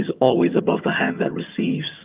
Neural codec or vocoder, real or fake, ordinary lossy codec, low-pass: vocoder, 22.05 kHz, 80 mel bands, HiFi-GAN; fake; Opus, 32 kbps; 3.6 kHz